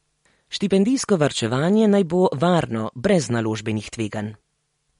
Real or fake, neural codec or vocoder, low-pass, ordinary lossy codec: real; none; 10.8 kHz; MP3, 48 kbps